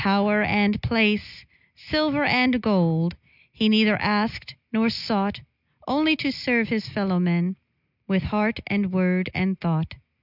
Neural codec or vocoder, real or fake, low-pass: none; real; 5.4 kHz